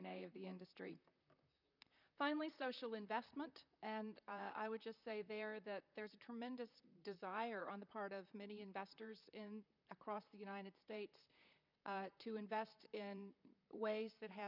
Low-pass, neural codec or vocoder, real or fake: 5.4 kHz; vocoder, 44.1 kHz, 128 mel bands, Pupu-Vocoder; fake